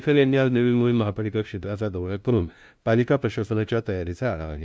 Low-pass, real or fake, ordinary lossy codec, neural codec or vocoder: none; fake; none; codec, 16 kHz, 0.5 kbps, FunCodec, trained on LibriTTS, 25 frames a second